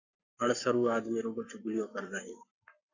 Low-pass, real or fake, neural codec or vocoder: 7.2 kHz; fake; codec, 44.1 kHz, 7.8 kbps, Pupu-Codec